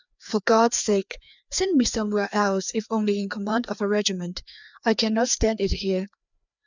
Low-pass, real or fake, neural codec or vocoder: 7.2 kHz; fake; codec, 16 kHz, 2 kbps, FreqCodec, larger model